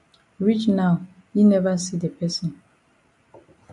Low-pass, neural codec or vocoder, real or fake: 10.8 kHz; none; real